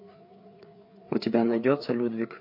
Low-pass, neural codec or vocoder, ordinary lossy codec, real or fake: 5.4 kHz; codec, 16 kHz, 4 kbps, FreqCodec, larger model; MP3, 32 kbps; fake